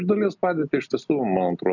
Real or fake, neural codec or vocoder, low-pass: real; none; 7.2 kHz